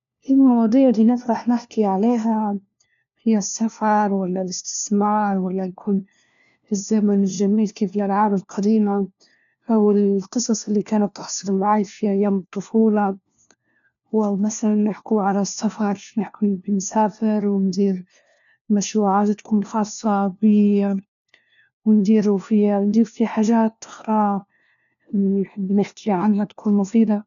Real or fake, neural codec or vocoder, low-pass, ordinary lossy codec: fake; codec, 16 kHz, 1 kbps, FunCodec, trained on LibriTTS, 50 frames a second; 7.2 kHz; none